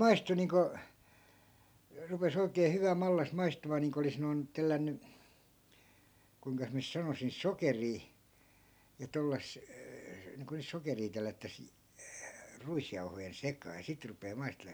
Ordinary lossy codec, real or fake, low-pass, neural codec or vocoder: none; real; none; none